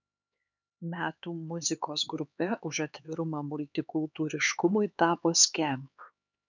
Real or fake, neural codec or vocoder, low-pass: fake; codec, 16 kHz, 4 kbps, X-Codec, HuBERT features, trained on LibriSpeech; 7.2 kHz